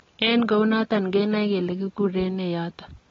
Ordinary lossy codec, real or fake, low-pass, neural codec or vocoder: AAC, 24 kbps; real; 7.2 kHz; none